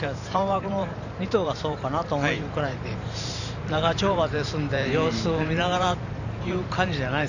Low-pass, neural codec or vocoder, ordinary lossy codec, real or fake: 7.2 kHz; vocoder, 44.1 kHz, 128 mel bands every 512 samples, BigVGAN v2; none; fake